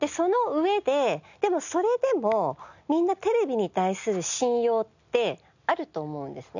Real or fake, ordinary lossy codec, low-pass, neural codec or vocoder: real; none; 7.2 kHz; none